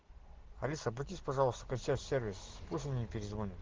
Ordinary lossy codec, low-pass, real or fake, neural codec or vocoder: Opus, 16 kbps; 7.2 kHz; real; none